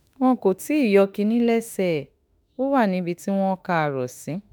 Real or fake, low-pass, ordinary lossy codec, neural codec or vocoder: fake; none; none; autoencoder, 48 kHz, 32 numbers a frame, DAC-VAE, trained on Japanese speech